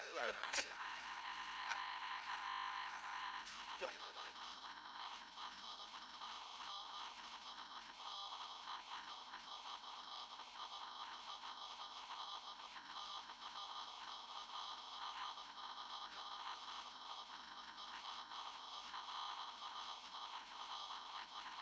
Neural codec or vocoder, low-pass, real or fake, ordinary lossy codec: codec, 16 kHz, 0.5 kbps, FreqCodec, larger model; none; fake; none